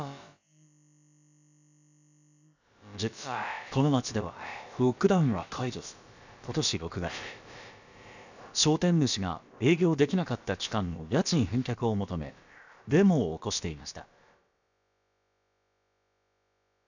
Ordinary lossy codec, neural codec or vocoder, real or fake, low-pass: none; codec, 16 kHz, about 1 kbps, DyCAST, with the encoder's durations; fake; 7.2 kHz